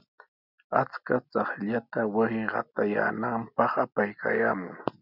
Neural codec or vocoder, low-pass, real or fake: none; 5.4 kHz; real